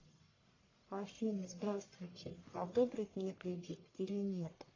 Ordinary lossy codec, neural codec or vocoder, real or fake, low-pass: AAC, 32 kbps; codec, 44.1 kHz, 1.7 kbps, Pupu-Codec; fake; 7.2 kHz